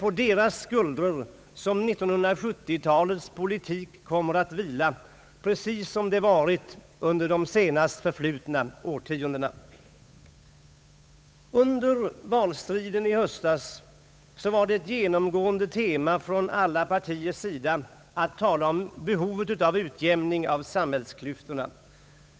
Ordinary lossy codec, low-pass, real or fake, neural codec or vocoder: none; none; fake; codec, 16 kHz, 8 kbps, FunCodec, trained on Chinese and English, 25 frames a second